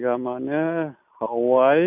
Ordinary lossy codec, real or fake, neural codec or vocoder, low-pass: none; real; none; 3.6 kHz